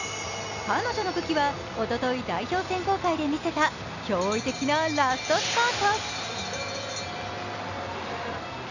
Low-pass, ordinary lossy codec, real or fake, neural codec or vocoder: 7.2 kHz; none; real; none